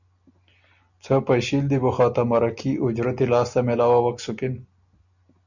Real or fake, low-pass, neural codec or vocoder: real; 7.2 kHz; none